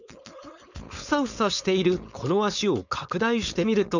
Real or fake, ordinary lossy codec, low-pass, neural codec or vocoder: fake; none; 7.2 kHz; codec, 16 kHz, 4.8 kbps, FACodec